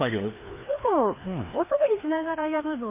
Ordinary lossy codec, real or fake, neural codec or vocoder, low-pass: MP3, 24 kbps; fake; codec, 16 kHz, 2 kbps, FreqCodec, larger model; 3.6 kHz